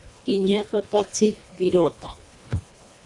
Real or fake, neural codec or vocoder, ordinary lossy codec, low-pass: fake; codec, 24 kHz, 1.5 kbps, HILCodec; Opus, 64 kbps; 10.8 kHz